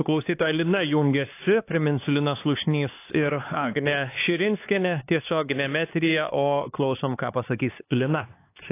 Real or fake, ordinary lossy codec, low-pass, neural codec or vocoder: fake; AAC, 24 kbps; 3.6 kHz; codec, 16 kHz, 2 kbps, X-Codec, HuBERT features, trained on LibriSpeech